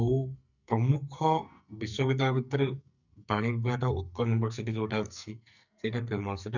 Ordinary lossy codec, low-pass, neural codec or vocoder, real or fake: none; 7.2 kHz; codec, 44.1 kHz, 2.6 kbps, SNAC; fake